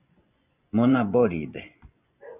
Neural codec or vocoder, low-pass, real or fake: vocoder, 24 kHz, 100 mel bands, Vocos; 3.6 kHz; fake